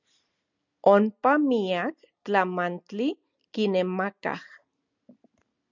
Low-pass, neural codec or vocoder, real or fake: 7.2 kHz; none; real